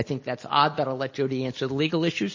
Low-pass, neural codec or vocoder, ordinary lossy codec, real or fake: 7.2 kHz; none; MP3, 32 kbps; real